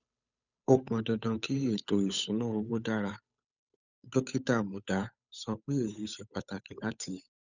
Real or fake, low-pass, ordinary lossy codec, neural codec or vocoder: fake; 7.2 kHz; none; codec, 16 kHz, 8 kbps, FunCodec, trained on Chinese and English, 25 frames a second